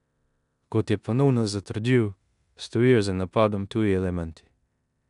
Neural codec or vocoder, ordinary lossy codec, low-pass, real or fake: codec, 16 kHz in and 24 kHz out, 0.9 kbps, LongCat-Audio-Codec, four codebook decoder; none; 10.8 kHz; fake